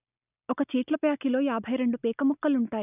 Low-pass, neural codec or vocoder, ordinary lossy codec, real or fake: 3.6 kHz; none; none; real